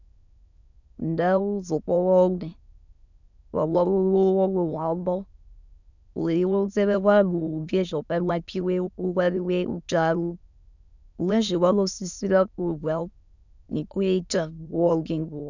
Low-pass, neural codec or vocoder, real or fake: 7.2 kHz; autoencoder, 22.05 kHz, a latent of 192 numbers a frame, VITS, trained on many speakers; fake